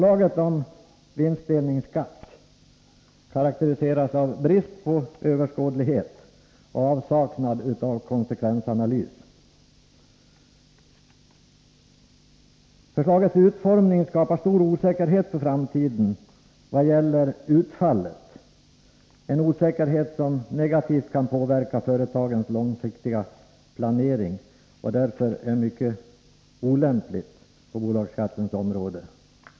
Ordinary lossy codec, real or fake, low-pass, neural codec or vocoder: none; real; none; none